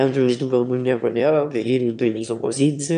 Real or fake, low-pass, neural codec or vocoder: fake; 9.9 kHz; autoencoder, 22.05 kHz, a latent of 192 numbers a frame, VITS, trained on one speaker